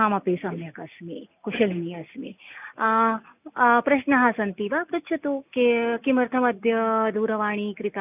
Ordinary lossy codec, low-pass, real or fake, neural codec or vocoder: none; 3.6 kHz; real; none